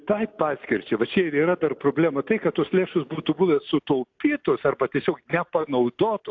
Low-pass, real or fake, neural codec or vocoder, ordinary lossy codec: 7.2 kHz; real; none; AAC, 48 kbps